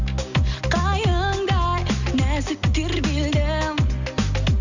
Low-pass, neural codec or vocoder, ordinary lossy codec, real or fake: 7.2 kHz; none; Opus, 64 kbps; real